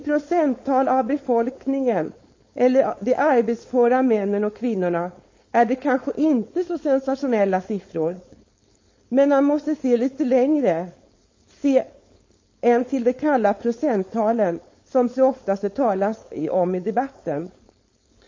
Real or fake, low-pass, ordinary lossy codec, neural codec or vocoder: fake; 7.2 kHz; MP3, 32 kbps; codec, 16 kHz, 4.8 kbps, FACodec